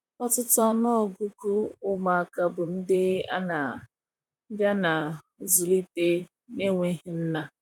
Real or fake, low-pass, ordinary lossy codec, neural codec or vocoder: fake; 19.8 kHz; none; vocoder, 44.1 kHz, 128 mel bands, Pupu-Vocoder